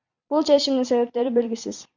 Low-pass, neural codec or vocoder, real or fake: 7.2 kHz; vocoder, 44.1 kHz, 128 mel bands every 256 samples, BigVGAN v2; fake